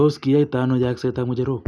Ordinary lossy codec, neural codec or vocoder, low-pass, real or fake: none; none; none; real